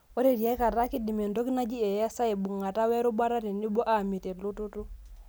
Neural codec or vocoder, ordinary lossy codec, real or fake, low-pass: none; none; real; none